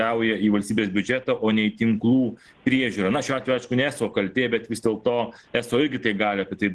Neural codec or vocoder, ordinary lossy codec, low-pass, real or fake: none; Opus, 16 kbps; 10.8 kHz; real